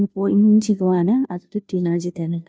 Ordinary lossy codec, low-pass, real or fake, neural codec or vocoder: none; none; fake; codec, 16 kHz, 0.5 kbps, FunCodec, trained on Chinese and English, 25 frames a second